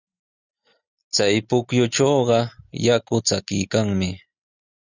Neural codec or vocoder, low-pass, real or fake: none; 7.2 kHz; real